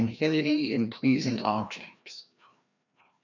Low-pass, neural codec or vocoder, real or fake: 7.2 kHz; codec, 16 kHz, 1 kbps, FreqCodec, larger model; fake